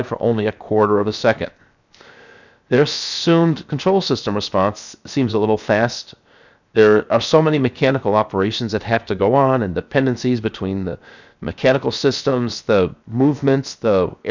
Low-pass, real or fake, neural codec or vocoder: 7.2 kHz; fake; codec, 16 kHz, 0.7 kbps, FocalCodec